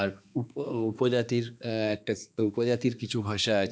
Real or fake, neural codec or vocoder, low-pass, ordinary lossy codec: fake; codec, 16 kHz, 2 kbps, X-Codec, HuBERT features, trained on balanced general audio; none; none